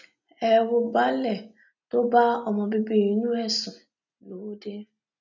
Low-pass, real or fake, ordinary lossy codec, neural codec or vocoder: 7.2 kHz; real; none; none